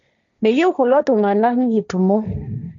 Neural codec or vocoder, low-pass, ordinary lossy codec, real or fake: codec, 16 kHz, 1.1 kbps, Voila-Tokenizer; 7.2 kHz; none; fake